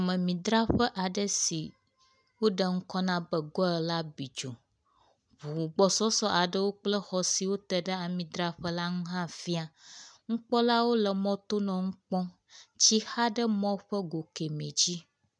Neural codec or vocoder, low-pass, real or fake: none; 9.9 kHz; real